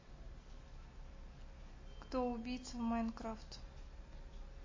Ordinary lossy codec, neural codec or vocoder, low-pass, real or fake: MP3, 32 kbps; none; 7.2 kHz; real